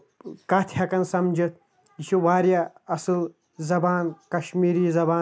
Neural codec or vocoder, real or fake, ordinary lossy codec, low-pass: none; real; none; none